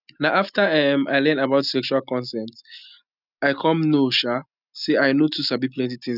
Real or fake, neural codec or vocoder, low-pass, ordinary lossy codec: real; none; 5.4 kHz; none